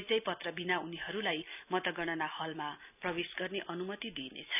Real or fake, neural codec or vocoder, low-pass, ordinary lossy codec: real; none; 3.6 kHz; none